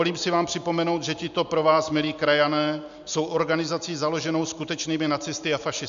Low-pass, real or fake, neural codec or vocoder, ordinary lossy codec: 7.2 kHz; real; none; MP3, 64 kbps